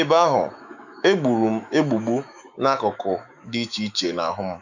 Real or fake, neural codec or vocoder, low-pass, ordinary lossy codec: fake; autoencoder, 48 kHz, 128 numbers a frame, DAC-VAE, trained on Japanese speech; 7.2 kHz; none